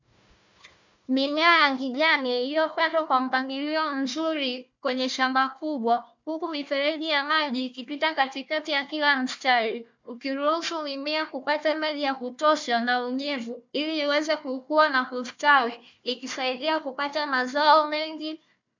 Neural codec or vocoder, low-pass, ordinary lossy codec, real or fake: codec, 16 kHz, 1 kbps, FunCodec, trained on Chinese and English, 50 frames a second; 7.2 kHz; MP3, 64 kbps; fake